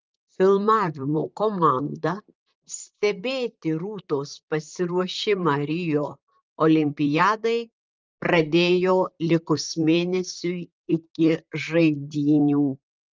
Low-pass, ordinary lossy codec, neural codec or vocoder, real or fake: 7.2 kHz; Opus, 24 kbps; vocoder, 44.1 kHz, 128 mel bands, Pupu-Vocoder; fake